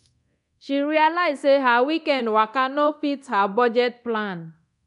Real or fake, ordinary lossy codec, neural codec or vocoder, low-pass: fake; none; codec, 24 kHz, 0.9 kbps, DualCodec; 10.8 kHz